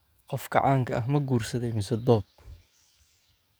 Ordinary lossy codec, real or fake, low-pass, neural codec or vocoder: none; fake; none; codec, 44.1 kHz, 7.8 kbps, DAC